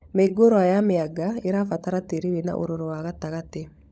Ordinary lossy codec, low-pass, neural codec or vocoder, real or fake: none; none; codec, 16 kHz, 16 kbps, FunCodec, trained on LibriTTS, 50 frames a second; fake